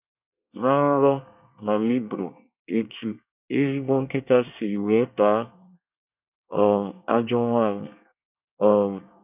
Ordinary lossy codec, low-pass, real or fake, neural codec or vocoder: none; 3.6 kHz; fake; codec, 24 kHz, 1 kbps, SNAC